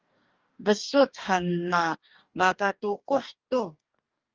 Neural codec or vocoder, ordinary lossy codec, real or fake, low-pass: codec, 44.1 kHz, 2.6 kbps, DAC; Opus, 24 kbps; fake; 7.2 kHz